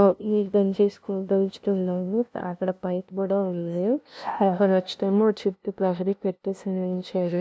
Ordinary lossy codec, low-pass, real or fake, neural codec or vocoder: none; none; fake; codec, 16 kHz, 0.5 kbps, FunCodec, trained on LibriTTS, 25 frames a second